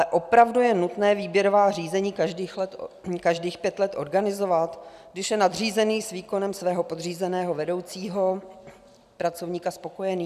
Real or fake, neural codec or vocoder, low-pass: real; none; 14.4 kHz